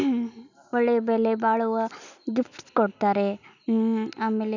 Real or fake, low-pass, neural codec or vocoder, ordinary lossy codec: real; 7.2 kHz; none; none